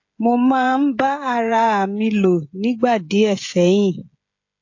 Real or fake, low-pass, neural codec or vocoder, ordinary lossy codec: fake; 7.2 kHz; codec, 16 kHz, 16 kbps, FreqCodec, smaller model; AAC, 48 kbps